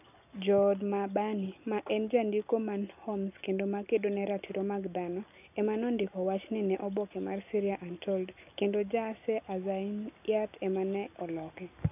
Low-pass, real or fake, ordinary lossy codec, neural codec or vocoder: 3.6 kHz; real; none; none